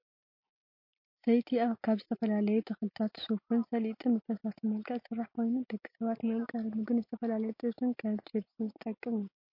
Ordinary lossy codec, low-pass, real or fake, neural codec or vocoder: MP3, 32 kbps; 5.4 kHz; real; none